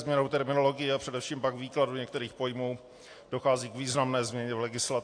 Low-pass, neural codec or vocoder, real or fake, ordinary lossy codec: 9.9 kHz; none; real; AAC, 48 kbps